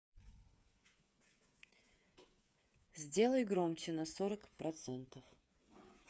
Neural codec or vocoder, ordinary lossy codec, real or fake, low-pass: codec, 16 kHz, 8 kbps, FreqCodec, smaller model; none; fake; none